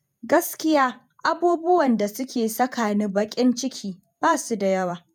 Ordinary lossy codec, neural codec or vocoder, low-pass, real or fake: none; vocoder, 48 kHz, 128 mel bands, Vocos; none; fake